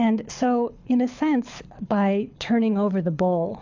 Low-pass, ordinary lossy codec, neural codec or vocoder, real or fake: 7.2 kHz; AAC, 48 kbps; codec, 24 kHz, 6 kbps, HILCodec; fake